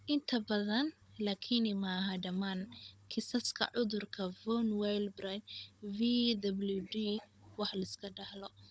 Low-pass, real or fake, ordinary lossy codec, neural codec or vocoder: none; fake; none; codec, 16 kHz, 16 kbps, FunCodec, trained on Chinese and English, 50 frames a second